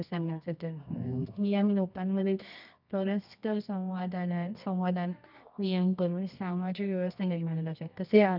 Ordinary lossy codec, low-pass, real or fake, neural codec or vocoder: none; 5.4 kHz; fake; codec, 24 kHz, 0.9 kbps, WavTokenizer, medium music audio release